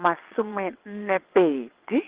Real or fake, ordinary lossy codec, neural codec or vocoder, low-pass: fake; Opus, 16 kbps; vocoder, 22.05 kHz, 80 mel bands, WaveNeXt; 3.6 kHz